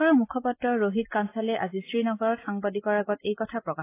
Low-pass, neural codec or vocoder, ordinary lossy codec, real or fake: 3.6 kHz; none; AAC, 24 kbps; real